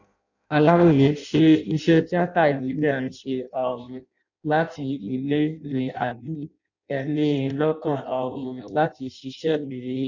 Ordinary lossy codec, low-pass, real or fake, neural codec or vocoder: none; 7.2 kHz; fake; codec, 16 kHz in and 24 kHz out, 0.6 kbps, FireRedTTS-2 codec